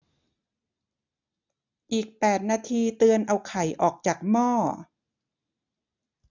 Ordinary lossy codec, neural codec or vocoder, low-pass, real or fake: none; none; 7.2 kHz; real